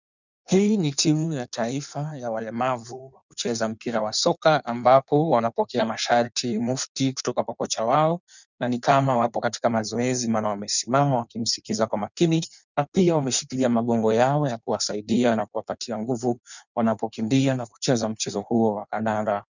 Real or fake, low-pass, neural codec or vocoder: fake; 7.2 kHz; codec, 16 kHz in and 24 kHz out, 1.1 kbps, FireRedTTS-2 codec